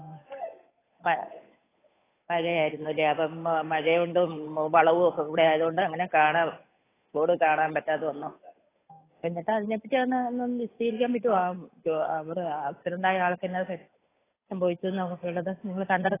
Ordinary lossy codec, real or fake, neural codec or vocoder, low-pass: AAC, 16 kbps; fake; codec, 16 kHz, 8 kbps, FunCodec, trained on Chinese and English, 25 frames a second; 3.6 kHz